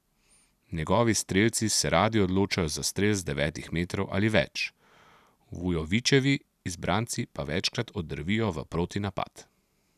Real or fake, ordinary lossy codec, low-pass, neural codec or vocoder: real; none; 14.4 kHz; none